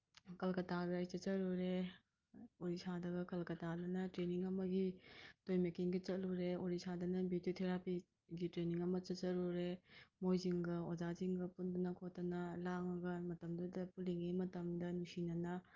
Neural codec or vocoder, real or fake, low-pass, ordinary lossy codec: none; real; 7.2 kHz; Opus, 24 kbps